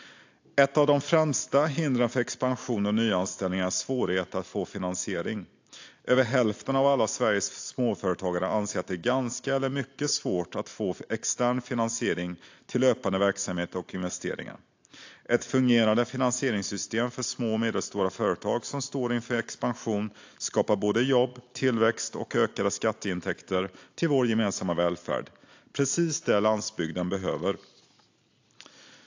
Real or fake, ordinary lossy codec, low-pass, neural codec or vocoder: real; AAC, 48 kbps; 7.2 kHz; none